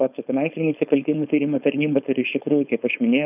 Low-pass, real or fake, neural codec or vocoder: 3.6 kHz; fake; codec, 16 kHz, 4.8 kbps, FACodec